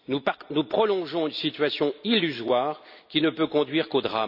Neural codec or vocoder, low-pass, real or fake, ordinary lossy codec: none; 5.4 kHz; real; none